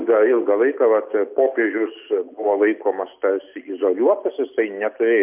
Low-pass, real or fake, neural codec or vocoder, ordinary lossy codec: 3.6 kHz; real; none; MP3, 32 kbps